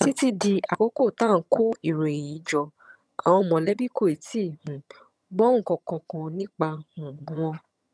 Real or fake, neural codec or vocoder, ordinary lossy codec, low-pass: fake; vocoder, 22.05 kHz, 80 mel bands, HiFi-GAN; none; none